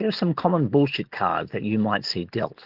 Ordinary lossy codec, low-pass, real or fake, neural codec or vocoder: Opus, 16 kbps; 5.4 kHz; real; none